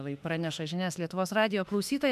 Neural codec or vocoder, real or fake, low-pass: autoencoder, 48 kHz, 32 numbers a frame, DAC-VAE, trained on Japanese speech; fake; 14.4 kHz